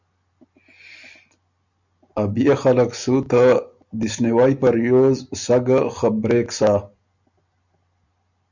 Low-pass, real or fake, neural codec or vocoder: 7.2 kHz; real; none